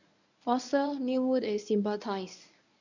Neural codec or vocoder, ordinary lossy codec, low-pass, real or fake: codec, 24 kHz, 0.9 kbps, WavTokenizer, medium speech release version 1; none; 7.2 kHz; fake